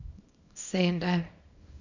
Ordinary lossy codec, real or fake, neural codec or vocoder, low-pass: none; fake; codec, 24 kHz, 0.9 kbps, WavTokenizer, small release; 7.2 kHz